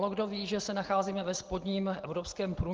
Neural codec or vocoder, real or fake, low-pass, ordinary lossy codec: none; real; 7.2 kHz; Opus, 16 kbps